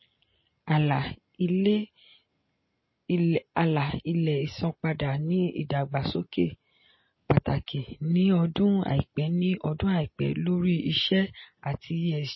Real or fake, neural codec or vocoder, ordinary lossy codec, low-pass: real; none; MP3, 24 kbps; 7.2 kHz